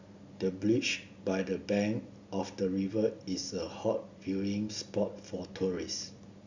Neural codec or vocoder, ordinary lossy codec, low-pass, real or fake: none; Opus, 64 kbps; 7.2 kHz; real